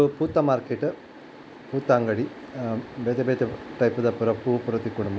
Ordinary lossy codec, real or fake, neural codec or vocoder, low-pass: none; real; none; none